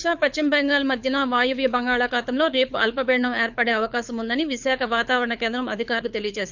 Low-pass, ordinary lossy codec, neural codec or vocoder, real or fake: 7.2 kHz; none; codec, 24 kHz, 6 kbps, HILCodec; fake